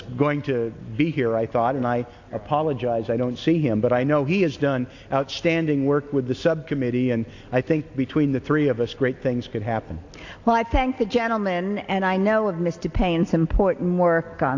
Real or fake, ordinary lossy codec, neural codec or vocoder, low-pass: real; AAC, 48 kbps; none; 7.2 kHz